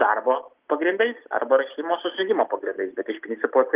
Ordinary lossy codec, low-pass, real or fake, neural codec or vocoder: Opus, 32 kbps; 3.6 kHz; real; none